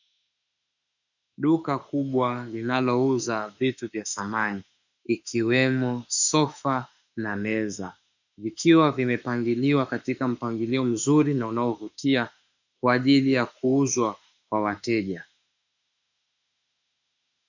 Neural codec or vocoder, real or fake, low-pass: autoencoder, 48 kHz, 32 numbers a frame, DAC-VAE, trained on Japanese speech; fake; 7.2 kHz